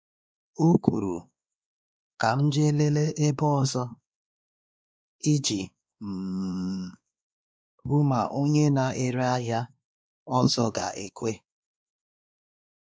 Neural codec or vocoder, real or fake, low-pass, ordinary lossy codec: codec, 16 kHz, 2 kbps, X-Codec, WavLM features, trained on Multilingual LibriSpeech; fake; none; none